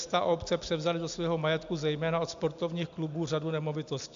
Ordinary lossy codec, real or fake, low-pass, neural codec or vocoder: AAC, 64 kbps; real; 7.2 kHz; none